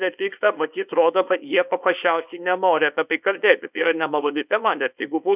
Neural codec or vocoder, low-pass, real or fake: codec, 24 kHz, 0.9 kbps, WavTokenizer, small release; 3.6 kHz; fake